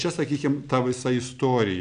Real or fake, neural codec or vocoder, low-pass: real; none; 9.9 kHz